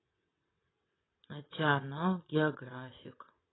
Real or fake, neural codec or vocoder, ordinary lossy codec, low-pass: fake; vocoder, 22.05 kHz, 80 mel bands, WaveNeXt; AAC, 16 kbps; 7.2 kHz